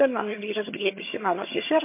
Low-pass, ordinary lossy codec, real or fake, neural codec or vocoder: 3.6 kHz; MP3, 24 kbps; fake; vocoder, 22.05 kHz, 80 mel bands, HiFi-GAN